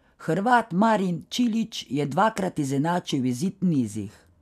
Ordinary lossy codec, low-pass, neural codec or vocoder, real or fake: none; 14.4 kHz; none; real